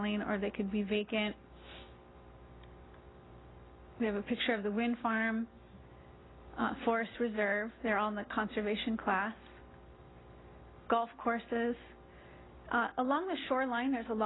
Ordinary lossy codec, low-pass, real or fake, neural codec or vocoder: AAC, 16 kbps; 7.2 kHz; real; none